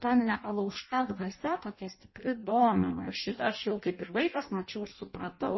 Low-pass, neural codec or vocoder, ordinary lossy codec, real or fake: 7.2 kHz; codec, 16 kHz in and 24 kHz out, 0.6 kbps, FireRedTTS-2 codec; MP3, 24 kbps; fake